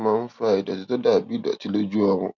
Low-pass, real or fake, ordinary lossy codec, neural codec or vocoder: 7.2 kHz; real; none; none